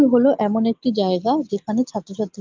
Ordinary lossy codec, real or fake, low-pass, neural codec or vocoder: Opus, 24 kbps; real; 7.2 kHz; none